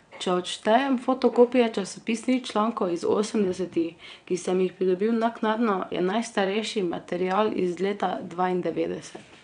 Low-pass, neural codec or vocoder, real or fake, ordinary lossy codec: 9.9 kHz; vocoder, 22.05 kHz, 80 mel bands, WaveNeXt; fake; none